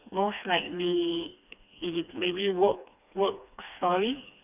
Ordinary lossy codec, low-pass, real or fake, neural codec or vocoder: none; 3.6 kHz; fake; codec, 16 kHz, 2 kbps, FreqCodec, smaller model